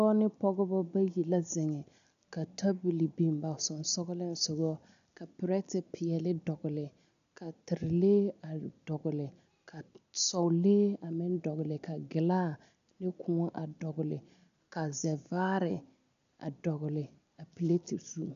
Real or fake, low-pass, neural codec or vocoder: real; 7.2 kHz; none